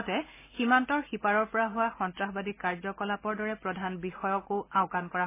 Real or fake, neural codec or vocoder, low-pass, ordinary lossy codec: real; none; 3.6 kHz; MP3, 16 kbps